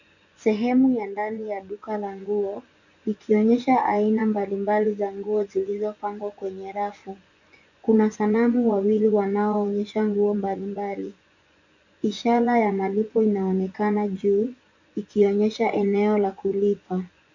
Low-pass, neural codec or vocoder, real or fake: 7.2 kHz; vocoder, 24 kHz, 100 mel bands, Vocos; fake